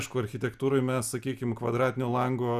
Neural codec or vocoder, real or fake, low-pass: none; real; 14.4 kHz